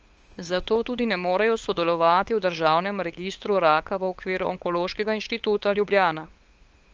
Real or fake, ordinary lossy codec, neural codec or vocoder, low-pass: fake; Opus, 24 kbps; codec, 16 kHz, 8 kbps, FunCodec, trained on LibriTTS, 25 frames a second; 7.2 kHz